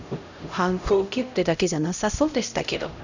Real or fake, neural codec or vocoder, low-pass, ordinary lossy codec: fake; codec, 16 kHz, 0.5 kbps, X-Codec, HuBERT features, trained on LibriSpeech; 7.2 kHz; none